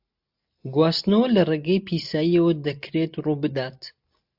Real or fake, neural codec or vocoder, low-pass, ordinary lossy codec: real; none; 5.4 kHz; AAC, 48 kbps